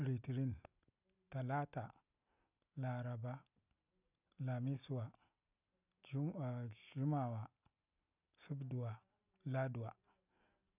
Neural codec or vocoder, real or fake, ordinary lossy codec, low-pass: none; real; none; 3.6 kHz